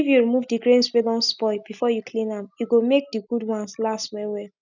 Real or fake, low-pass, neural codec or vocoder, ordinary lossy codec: real; 7.2 kHz; none; none